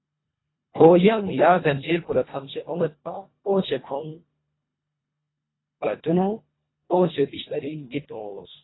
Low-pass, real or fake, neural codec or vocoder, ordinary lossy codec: 7.2 kHz; fake; codec, 24 kHz, 1.5 kbps, HILCodec; AAC, 16 kbps